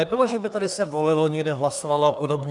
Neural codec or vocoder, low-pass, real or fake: codec, 24 kHz, 1 kbps, SNAC; 10.8 kHz; fake